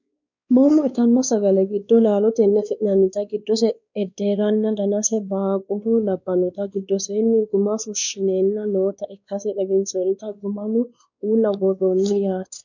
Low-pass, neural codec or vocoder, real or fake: 7.2 kHz; codec, 16 kHz, 2 kbps, X-Codec, WavLM features, trained on Multilingual LibriSpeech; fake